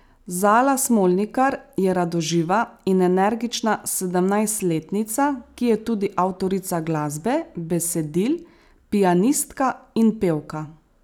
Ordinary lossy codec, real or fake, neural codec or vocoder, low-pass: none; real; none; none